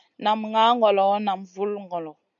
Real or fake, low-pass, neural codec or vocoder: real; 7.2 kHz; none